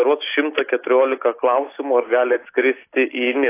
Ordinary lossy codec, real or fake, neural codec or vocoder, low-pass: AAC, 24 kbps; real; none; 3.6 kHz